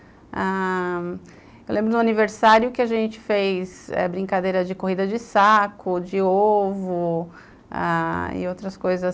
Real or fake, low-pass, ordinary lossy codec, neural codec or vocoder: real; none; none; none